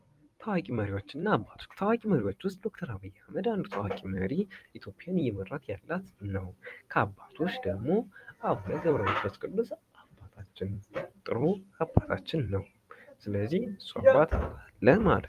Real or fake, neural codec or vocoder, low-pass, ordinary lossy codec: fake; vocoder, 44.1 kHz, 128 mel bands every 256 samples, BigVGAN v2; 14.4 kHz; Opus, 32 kbps